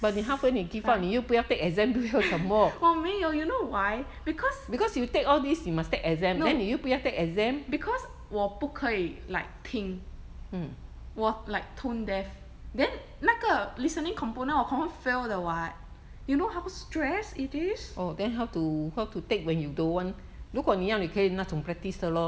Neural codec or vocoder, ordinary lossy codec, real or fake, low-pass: none; none; real; none